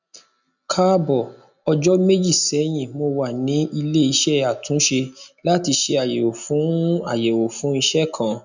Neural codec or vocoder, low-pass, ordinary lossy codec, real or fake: none; 7.2 kHz; none; real